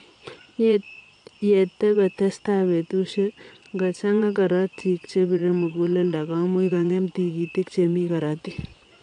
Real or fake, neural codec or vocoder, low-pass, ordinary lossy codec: fake; vocoder, 22.05 kHz, 80 mel bands, Vocos; 9.9 kHz; MP3, 64 kbps